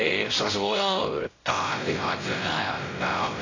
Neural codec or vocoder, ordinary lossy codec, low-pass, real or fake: codec, 16 kHz, 0.5 kbps, X-Codec, WavLM features, trained on Multilingual LibriSpeech; AAC, 32 kbps; 7.2 kHz; fake